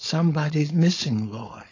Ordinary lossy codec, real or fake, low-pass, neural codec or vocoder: AAC, 32 kbps; fake; 7.2 kHz; codec, 16 kHz, 4.8 kbps, FACodec